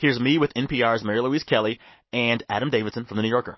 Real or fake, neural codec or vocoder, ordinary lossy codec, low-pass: real; none; MP3, 24 kbps; 7.2 kHz